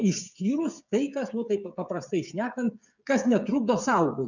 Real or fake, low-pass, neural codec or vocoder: fake; 7.2 kHz; codec, 16 kHz, 8 kbps, FreqCodec, smaller model